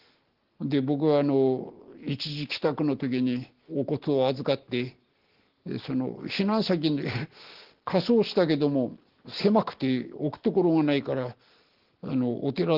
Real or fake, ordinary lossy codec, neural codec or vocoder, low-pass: real; Opus, 16 kbps; none; 5.4 kHz